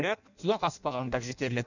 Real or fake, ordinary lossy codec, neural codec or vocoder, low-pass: fake; none; codec, 16 kHz in and 24 kHz out, 0.6 kbps, FireRedTTS-2 codec; 7.2 kHz